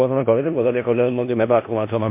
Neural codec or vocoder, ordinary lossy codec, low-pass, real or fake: codec, 16 kHz in and 24 kHz out, 0.4 kbps, LongCat-Audio-Codec, four codebook decoder; MP3, 24 kbps; 3.6 kHz; fake